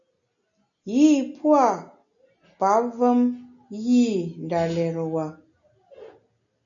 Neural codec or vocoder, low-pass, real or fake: none; 7.2 kHz; real